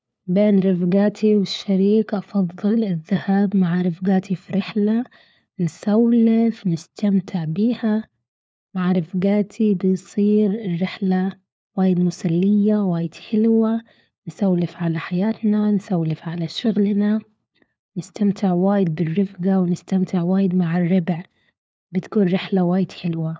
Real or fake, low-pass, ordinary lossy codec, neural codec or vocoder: fake; none; none; codec, 16 kHz, 4 kbps, FunCodec, trained on LibriTTS, 50 frames a second